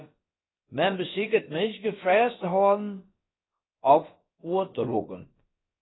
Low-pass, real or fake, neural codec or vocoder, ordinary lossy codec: 7.2 kHz; fake; codec, 16 kHz, about 1 kbps, DyCAST, with the encoder's durations; AAC, 16 kbps